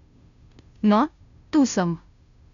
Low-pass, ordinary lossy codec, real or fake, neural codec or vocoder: 7.2 kHz; MP3, 96 kbps; fake; codec, 16 kHz, 0.5 kbps, FunCodec, trained on Chinese and English, 25 frames a second